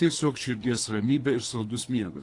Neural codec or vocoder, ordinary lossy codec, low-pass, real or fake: codec, 24 kHz, 3 kbps, HILCodec; AAC, 48 kbps; 10.8 kHz; fake